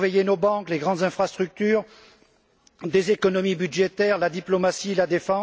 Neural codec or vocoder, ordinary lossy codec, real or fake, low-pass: none; none; real; none